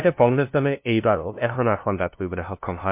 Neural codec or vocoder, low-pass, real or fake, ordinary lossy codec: codec, 16 kHz, 0.5 kbps, FunCodec, trained on LibriTTS, 25 frames a second; 3.6 kHz; fake; MP3, 32 kbps